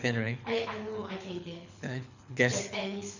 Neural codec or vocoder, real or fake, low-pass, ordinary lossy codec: codec, 24 kHz, 6 kbps, HILCodec; fake; 7.2 kHz; none